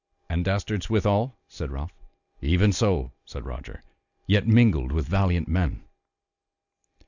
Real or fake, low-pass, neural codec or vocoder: real; 7.2 kHz; none